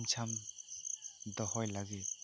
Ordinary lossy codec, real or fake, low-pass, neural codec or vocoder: none; real; none; none